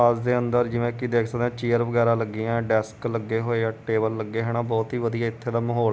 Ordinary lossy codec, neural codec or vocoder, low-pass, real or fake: none; none; none; real